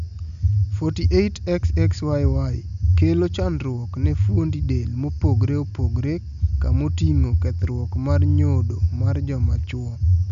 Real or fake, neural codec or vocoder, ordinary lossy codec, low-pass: real; none; none; 7.2 kHz